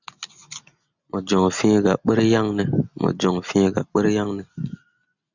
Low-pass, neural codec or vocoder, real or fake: 7.2 kHz; none; real